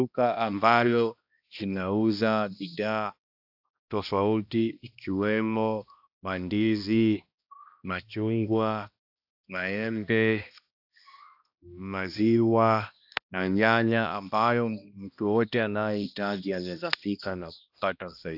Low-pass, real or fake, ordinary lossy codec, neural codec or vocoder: 5.4 kHz; fake; AAC, 48 kbps; codec, 16 kHz, 1 kbps, X-Codec, HuBERT features, trained on balanced general audio